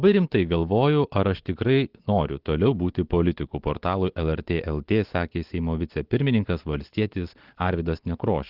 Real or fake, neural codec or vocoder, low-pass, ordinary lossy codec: real; none; 5.4 kHz; Opus, 16 kbps